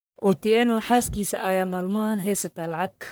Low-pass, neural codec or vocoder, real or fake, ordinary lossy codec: none; codec, 44.1 kHz, 1.7 kbps, Pupu-Codec; fake; none